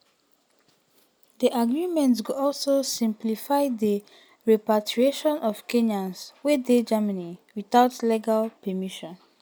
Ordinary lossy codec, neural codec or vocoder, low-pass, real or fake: none; none; none; real